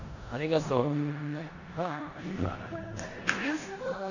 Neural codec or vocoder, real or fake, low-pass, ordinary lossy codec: codec, 16 kHz in and 24 kHz out, 0.9 kbps, LongCat-Audio-Codec, four codebook decoder; fake; 7.2 kHz; none